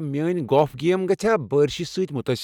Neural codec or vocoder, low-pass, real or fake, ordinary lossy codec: none; 19.8 kHz; real; none